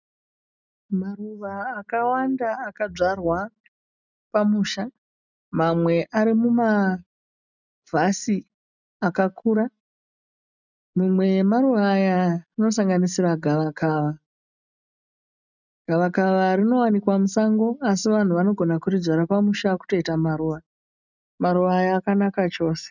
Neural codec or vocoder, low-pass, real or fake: none; 7.2 kHz; real